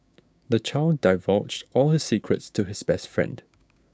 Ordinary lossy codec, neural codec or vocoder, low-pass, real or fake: none; codec, 16 kHz, 6 kbps, DAC; none; fake